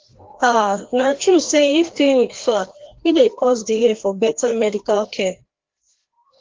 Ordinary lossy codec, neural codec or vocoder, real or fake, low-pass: Opus, 16 kbps; codec, 16 kHz, 1 kbps, FreqCodec, larger model; fake; 7.2 kHz